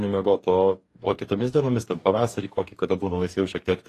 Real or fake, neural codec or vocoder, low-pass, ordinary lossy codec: fake; codec, 44.1 kHz, 2.6 kbps, DAC; 14.4 kHz; AAC, 48 kbps